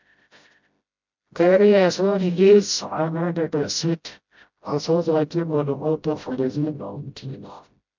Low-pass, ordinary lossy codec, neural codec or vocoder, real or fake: 7.2 kHz; MP3, 64 kbps; codec, 16 kHz, 0.5 kbps, FreqCodec, smaller model; fake